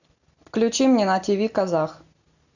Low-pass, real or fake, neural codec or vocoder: 7.2 kHz; real; none